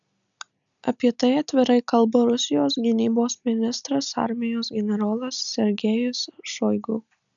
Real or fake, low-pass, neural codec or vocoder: real; 7.2 kHz; none